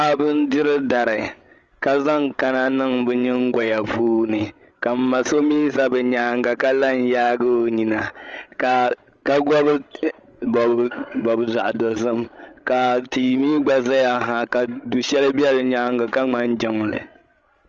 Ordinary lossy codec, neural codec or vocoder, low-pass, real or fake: Opus, 32 kbps; codec, 16 kHz, 16 kbps, FreqCodec, larger model; 7.2 kHz; fake